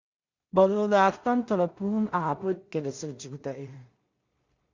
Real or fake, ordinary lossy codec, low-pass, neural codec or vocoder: fake; Opus, 64 kbps; 7.2 kHz; codec, 16 kHz in and 24 kHz out, 0.4 kbps, LongCat-Audio-Codec, two codebook decoder